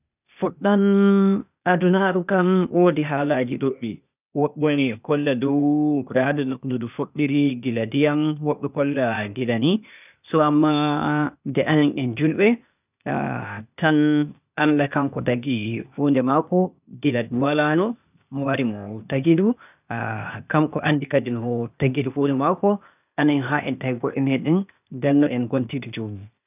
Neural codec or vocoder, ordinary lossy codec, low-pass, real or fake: codec, 16 kHz, 0.8 kbps, ZipCodec; none; 3.6 kHz; fake